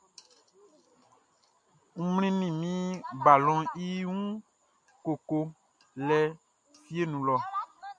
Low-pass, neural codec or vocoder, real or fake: 9.9 kHz; none; real